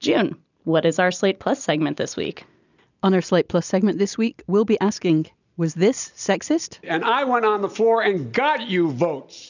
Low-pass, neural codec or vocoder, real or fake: 7.2 kHz; none; real